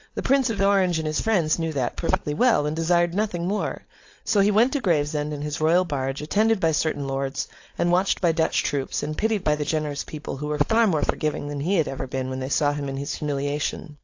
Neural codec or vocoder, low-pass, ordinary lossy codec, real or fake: codec, 16 kHz, 4.8 kbps, FACodec; 7.2 kHz; AAC, 48 kbps; fake